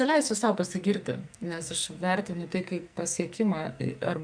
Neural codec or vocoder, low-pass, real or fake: codec, 44.1 kHz, 2.6 kbps, SNAC; 9.9 kHz; fake